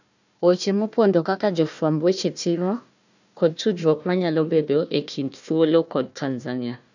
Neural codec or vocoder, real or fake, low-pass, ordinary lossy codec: codec, 16 kHz, 1 kbps, FunCodec, trained on Chinese and English, 50 frames a second; fake; 7.2 kHz; none